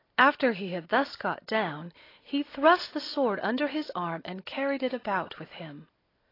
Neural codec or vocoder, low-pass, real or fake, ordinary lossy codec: none; 5.4 kHz; real; AAC, 24 kbps